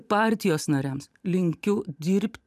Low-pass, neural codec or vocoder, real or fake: 14.4 kHz; vocoder, 44.1 kHz, 128 mel bands every 512 samples, BigVGAN v2; fake